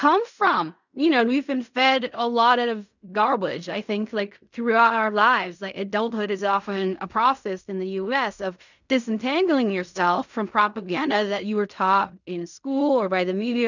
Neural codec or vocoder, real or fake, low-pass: codec, 16 kHz in and 24 kHz out, 0.4 kbps, LongCat-Audio-Codec, fine tuned four codebook decoder; fake; 7.2 kHz